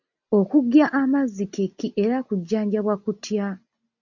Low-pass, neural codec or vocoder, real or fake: 7.2 kHz; none; real